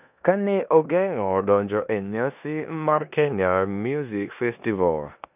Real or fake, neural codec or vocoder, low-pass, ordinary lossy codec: fake; codec, 16 kHz in and 24 kHz out, 0.9 kbps, LongCat-Audio-Codec, four codebook decoder; 3.6 kHz; none